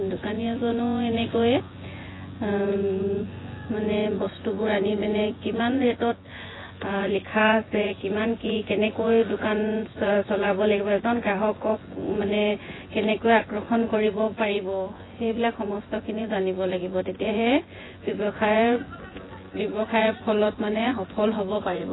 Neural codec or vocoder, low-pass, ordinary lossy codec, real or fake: vocoder, 24 kHz, 100 mel bands, Vocos; 7.2 kHz; AAC, 16 kbps; fake